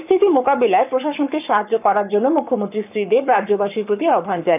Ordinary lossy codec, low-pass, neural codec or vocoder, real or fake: none; 3.6 kHz; codec, 44.1 kHz, 7.8 kbps, Pupu-Codec; fake